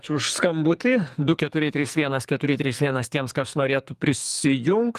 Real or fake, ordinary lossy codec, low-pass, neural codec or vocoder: fake; Opus, 32 kbps; 14.4 kHz; codec, 44.1 kHz, 2.6 kbps, SNAC